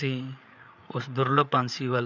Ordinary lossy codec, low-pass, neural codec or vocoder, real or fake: none; 7.2 kHz; codec, 16 kHz, 8 kbps, FreqCodec, larger model; fake